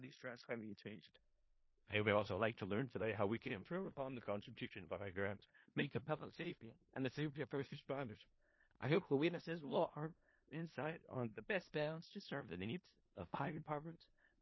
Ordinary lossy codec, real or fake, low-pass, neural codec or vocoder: MP3, 24 kbps; fake; 7.2 kHz; codec, 16 kHz in and 24 kHz out, 0.4 kbps, LongCat-Audio-Codec, four codebook decoder